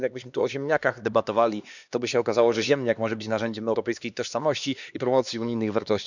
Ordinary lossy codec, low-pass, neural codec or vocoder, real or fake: none; 7.2 kHz; codec, 16 kHz, 2 kbps, X-Codec, HuBERT features, trained on LibriSpeech; fake